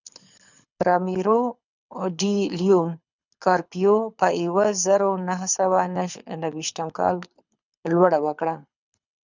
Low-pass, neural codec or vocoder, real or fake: 7.2 kHz; codec, 24 kHz, 6 kbps, HILCodec; fake